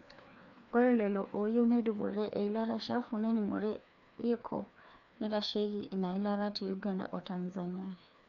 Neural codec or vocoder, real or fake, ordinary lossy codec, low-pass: codec, 16 kHz, 2 kbps, FreqCodec, larger model; fake; none; 7.2 kHz